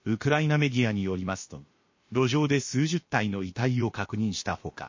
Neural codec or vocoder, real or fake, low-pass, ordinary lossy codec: codec, 16 kHz, about 1 kbps, DyCAST, with the encoder's durations; fake; 7.2 kHz; MP3, 32 kbps